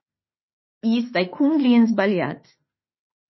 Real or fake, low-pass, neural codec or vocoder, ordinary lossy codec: fake; 7.2 kHz; autoencoder, 48 kHz, 32 numbers a frame, DAC-VAE, trained on Japanese speech; MP3, 24 kbps